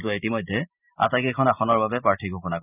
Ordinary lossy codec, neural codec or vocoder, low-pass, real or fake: none; none; 3.6 kHz; real